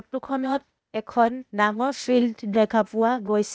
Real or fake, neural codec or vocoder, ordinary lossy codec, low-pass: fake; codec, 16 kHz, 0.8 kbps, ZipCodec; none; none